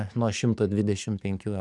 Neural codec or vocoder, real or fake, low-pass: codec, 44.1 kHz, 7.8 kbps, DAC; fake; 10.8 kHz